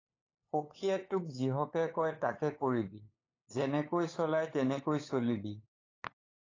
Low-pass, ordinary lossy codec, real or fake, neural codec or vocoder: 7.2 kHz; AAC, 32 kbps; fake; codec, 16 kHz, 8 kbps, FunCodec, trained on LibriTTS, 25 frames a second